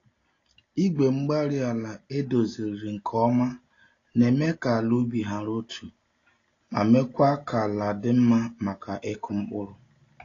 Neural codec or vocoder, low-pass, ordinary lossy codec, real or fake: none; 7.2 kHz; AAC, 32 kbps; real